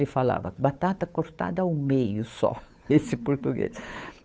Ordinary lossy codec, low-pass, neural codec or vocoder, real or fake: none; none; codec, 16 kHz, 8 kbps, FunCodec, trained on Chinese and English, 25 frames a second; fake